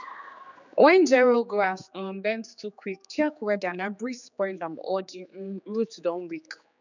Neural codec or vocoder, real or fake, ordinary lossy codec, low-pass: codec, 16 kHz, 4 kbps, X-Codec, HuBERT features, trained on general audio; fake; none; 7.2 kHz